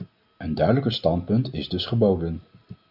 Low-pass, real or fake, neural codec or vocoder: 5.4 kHz; real; none